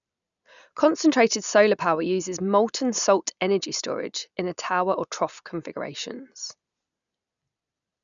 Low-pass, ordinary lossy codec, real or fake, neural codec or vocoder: 7.2 kHz; none; real; none